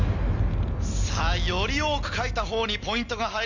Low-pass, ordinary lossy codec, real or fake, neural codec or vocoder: 7.2 kHz; none; real; none